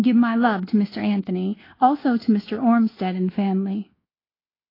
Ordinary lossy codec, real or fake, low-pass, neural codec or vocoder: AAC, 24 kbps; fake; 5.4 kHz; codec, 24 kHz, 1.2 kbps, DualCodec